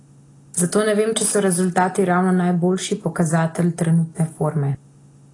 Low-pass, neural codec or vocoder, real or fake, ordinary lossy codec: 10.8 kHz; none; real; AAC, 32 kbps